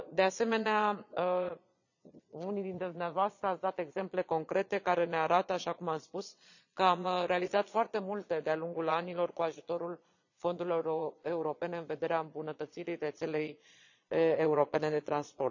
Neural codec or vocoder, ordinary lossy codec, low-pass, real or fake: vocoder, 22.05 kHz, 80 mel bands, Vocos; none; 7.2 kHz; fake